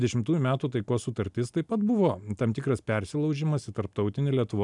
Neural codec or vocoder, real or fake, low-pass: none; real; 10.8 kHz